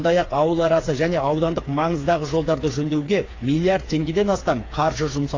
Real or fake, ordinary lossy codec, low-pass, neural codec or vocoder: fake; AAC, 32 kbps; 7.2 kHz; codec, 16 kHz, 4 kbps, FreqCodec, smaller model